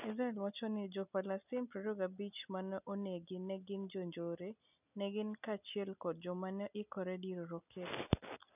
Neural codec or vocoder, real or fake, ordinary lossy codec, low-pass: none; real; none; 3.6 kHz